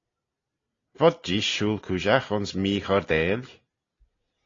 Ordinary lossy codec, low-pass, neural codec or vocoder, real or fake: AAC, 32 kbps; 7.2 kHz; none; real